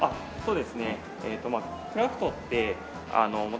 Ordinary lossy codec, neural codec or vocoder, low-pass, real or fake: none; none; none; real